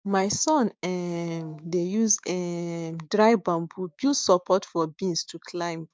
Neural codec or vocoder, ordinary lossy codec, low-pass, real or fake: codec, 16 kHz, 6 kbps, DAC; none; none; fake